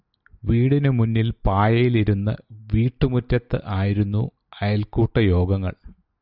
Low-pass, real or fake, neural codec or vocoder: 5.4 kHz; real; none